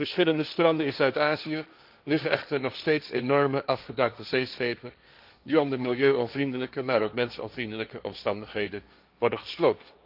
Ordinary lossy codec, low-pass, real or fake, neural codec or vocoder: none; 5.4 kHz; fake; codec, 16 kHz, 1.1 kbps, Voila-Tokenizer